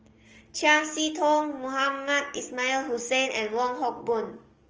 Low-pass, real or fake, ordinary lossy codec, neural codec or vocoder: 7.2 kHz; fake; Opus, 24 kbps; codec, 44.1 kHz, 7.8 kbps, DAC